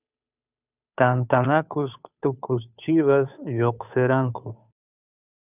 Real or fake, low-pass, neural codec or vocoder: fake; 3.6 kHz; codec, 16 kHz, 2 kbps, FunCodec, trained on Chinese and English, 25 frames a second